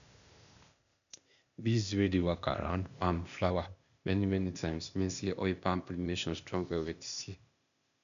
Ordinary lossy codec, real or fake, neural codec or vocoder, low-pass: none; fake; codec, 16 kHz, 0.8 kbps, ZipCodec; 7.2 kHz